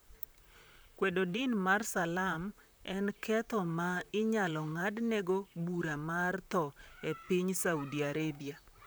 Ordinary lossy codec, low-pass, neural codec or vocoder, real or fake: none; none; vocoder, 44.1 kHz, 128 mel bands, Pupu-Vocoder; fake